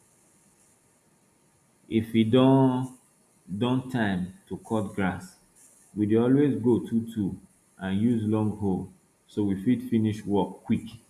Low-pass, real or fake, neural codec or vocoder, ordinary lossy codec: 14.4 kHz; real; none; none